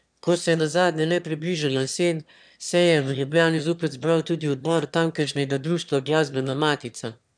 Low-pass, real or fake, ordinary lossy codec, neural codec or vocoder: 9.9 kHz; fake; none; autoencoder, 22.05 kHz, a latent of 192 numbers a frame, VITS, trained on one speaker